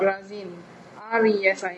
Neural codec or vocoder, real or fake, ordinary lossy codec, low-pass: none; real; none; none